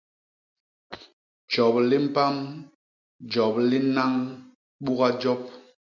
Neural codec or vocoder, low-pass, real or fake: none; 7.2 kHz; real